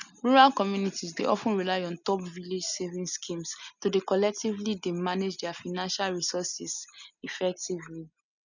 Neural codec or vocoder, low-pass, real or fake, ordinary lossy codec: none; 7.2 kHz; real; none